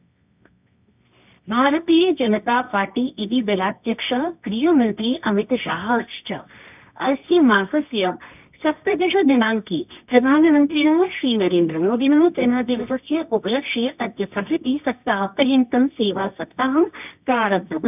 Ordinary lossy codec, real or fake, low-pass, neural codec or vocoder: none; fake; 3.6 kHz; codec, 24 kHz, 0.9 kbps, WavTokenizer, medium music audio release